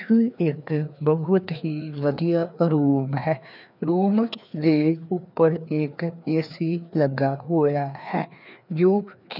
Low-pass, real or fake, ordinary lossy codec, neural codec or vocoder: 5.4 kHz; fake; none; codec, 16 kHz, 2 kbps, FreqCodec, larger model